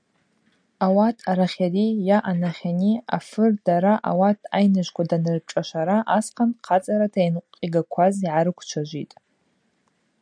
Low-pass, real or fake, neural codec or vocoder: 9.9 kHz; real; none